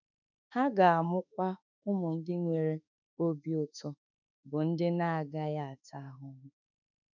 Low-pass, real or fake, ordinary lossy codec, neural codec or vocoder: 7.2 kHz; fake; none; autoencoder, 48 kHz, 32 numbers a frame, DAC-VAE, trained on Japanese speech